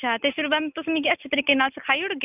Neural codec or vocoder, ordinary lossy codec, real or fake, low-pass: none; none; real; 3.6 kHz